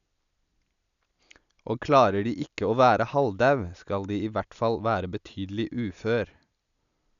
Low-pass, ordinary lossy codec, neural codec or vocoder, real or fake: 7.2 kHz; none; none; real